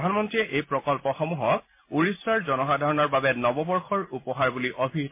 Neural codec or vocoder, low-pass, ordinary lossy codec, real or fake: none; 3.6 kHz; MP3, 24 kbps; real